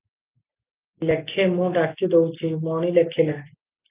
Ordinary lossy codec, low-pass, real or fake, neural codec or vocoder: Opus, 24 kbps; 3.6 kHz; real; none